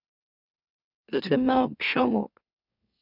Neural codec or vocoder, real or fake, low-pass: autoencoder, 44.1 kHz, a latent of 192 numbers a frame, MeloTTS; fake; 5.4 kHz